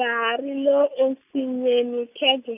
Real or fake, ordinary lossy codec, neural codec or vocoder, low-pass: real; none; none; 3.6 kHz